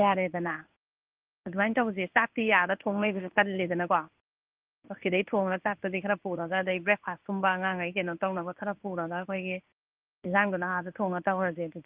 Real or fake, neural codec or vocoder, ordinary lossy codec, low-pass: fake; codec, 16 kHz in and 24 kHz out, 1 kbps, XY-Tokenizer; Opus, 32 kbps; 3.6 kHz